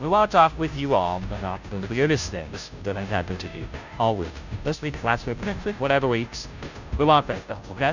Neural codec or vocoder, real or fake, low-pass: codec, 16 kHz, 0.5 kbps, FunCodec, trained on Chinese and English, 25 frames a second; fake; 7.2 kHz